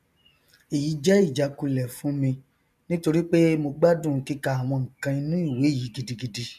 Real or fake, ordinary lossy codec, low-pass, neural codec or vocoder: real; none; 14.4 kHz; none